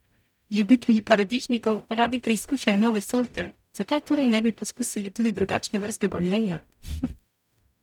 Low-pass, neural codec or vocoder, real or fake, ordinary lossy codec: 19.8 kHz; codec, 44.1 kHz, 0.9 kbps, DAC; fake; none